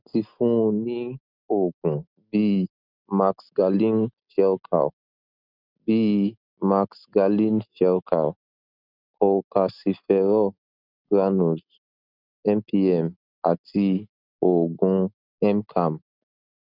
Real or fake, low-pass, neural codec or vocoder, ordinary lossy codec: real; 5.4 kHz; none; none